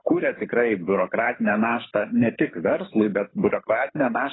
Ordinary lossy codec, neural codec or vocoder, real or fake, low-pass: AAC, 16 kbps; codec, 24 kHz, 6 kbps, HILCodec; fake; 7.2 kHz